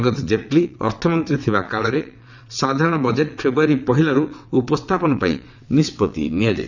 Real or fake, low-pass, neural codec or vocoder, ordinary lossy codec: fake; 7.2 kHz; vocoder, 22.05 kHz, 80 mel bands, WaveNeXt; none